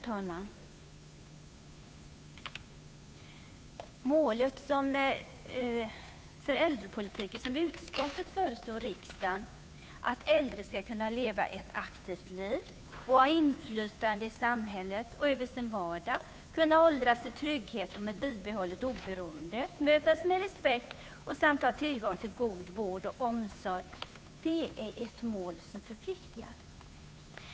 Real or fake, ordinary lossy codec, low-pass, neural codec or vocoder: fake; none; none; codec, 16 kHz, 2 kbps, FunCodec, trained on Chinese and English, 25 frames a second